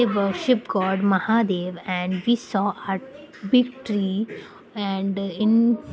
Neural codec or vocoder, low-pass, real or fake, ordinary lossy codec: none; none; real; none